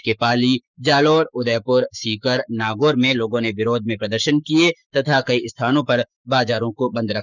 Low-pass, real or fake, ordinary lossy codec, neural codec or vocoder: 7.2 kHz; fake; none; codec, 44.1 kHz, 7.8 kbps, Pupu-Codec